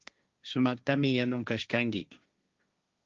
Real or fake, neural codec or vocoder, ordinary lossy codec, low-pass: fake; codec, 16 kHz, 1.1 kbps, Voila-Tokenizer; Opus, 32 kbps; 7.2 kHz